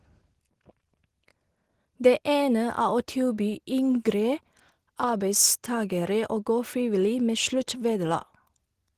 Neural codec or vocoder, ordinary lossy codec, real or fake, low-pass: none; Opus, 16 kbps; real; 14.4 kHz